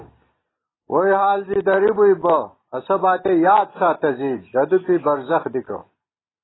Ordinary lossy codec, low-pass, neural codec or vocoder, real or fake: AAC, 16 kbps; 7.2 kHz; none; real